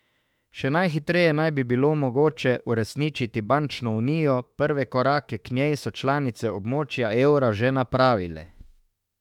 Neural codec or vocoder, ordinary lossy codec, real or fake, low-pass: autoencoder, 48 kHz, 32 numbers a frame, DAC-VAE, trained on Japanese speech; MP3, 96 kbps; fake; 19.8 kHz